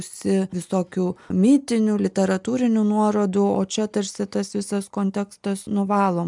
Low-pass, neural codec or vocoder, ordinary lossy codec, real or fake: 10.8 kHz; none; MP3, 96 kbps; real